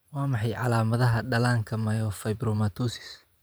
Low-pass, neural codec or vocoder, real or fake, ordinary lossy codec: none; vocoder, 44.1 kHz, 128 mel bands every 512 samples, BigVGAN v2; fake; none